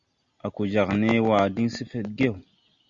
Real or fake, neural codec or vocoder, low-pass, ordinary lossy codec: real; none; 7.2 kHz; Opus, 64 kbps